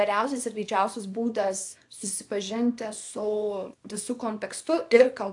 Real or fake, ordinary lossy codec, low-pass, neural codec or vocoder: fake; AAC, 64 kbps; 10.8 kHz; codec, 24 kHz, 0.9 kbps, WavTokenizer, small release